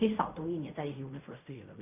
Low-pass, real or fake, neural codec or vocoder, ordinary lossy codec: 3.6 kHz; fake; codec, 16 kHz in and 24 kHz out, 0.4 kbps, LongCat-Audio-Codec, fine tuned four codebook decoder; none